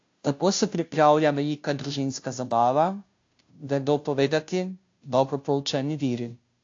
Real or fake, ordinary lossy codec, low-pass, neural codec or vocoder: fake; AAC, 48 kbps; 7.2 kHz; codec, 16 kHz, 0.5 kbps, FunCodec, trained on Chinese and English, 25 frames a second